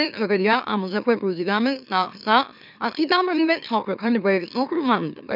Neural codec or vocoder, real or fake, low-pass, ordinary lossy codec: autoencoder, 44.1 kHz, a latent of 192 numbers a frame, MeloTTS; fake; 5.4 kHz; none